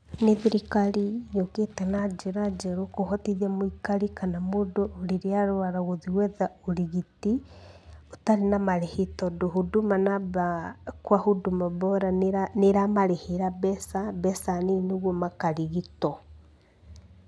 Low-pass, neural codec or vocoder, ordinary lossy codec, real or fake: none; none; none; real